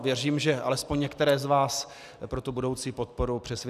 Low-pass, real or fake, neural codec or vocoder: 14.4 kHz; real; none